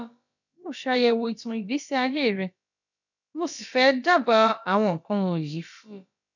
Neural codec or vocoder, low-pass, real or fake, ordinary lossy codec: codec, 16 kHz, about 1 kbps, DyCAST, with the encoder's durations; 7.2 kHz; fake; none